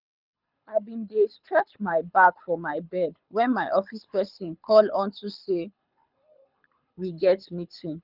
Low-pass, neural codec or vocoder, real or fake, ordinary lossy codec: 5.4 kHz; codec, 24 kHz, 6 kbps, HILCodec; fake; none